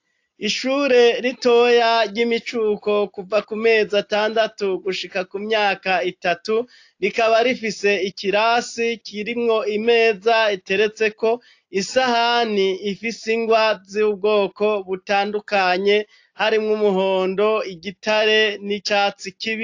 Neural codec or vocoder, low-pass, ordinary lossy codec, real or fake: none; 7.2 kHz; AAC, 48 kbps; real